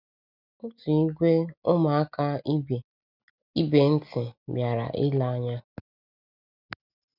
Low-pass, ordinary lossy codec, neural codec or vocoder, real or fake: 5.4 kHz; none; none; real